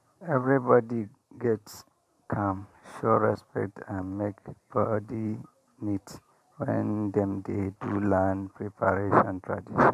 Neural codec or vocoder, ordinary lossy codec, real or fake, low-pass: vocoder, 44.1 kHz, 128 mel bands every 512 samples, BigVGAN v2; AAC, 96 kbps; fake; 14.4 kHz